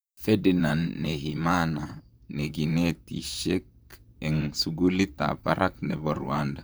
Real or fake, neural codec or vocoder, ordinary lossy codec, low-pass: fake; vocoder, 44.1 kHz, 128 mel bands, Pupu-Vocoder; none; none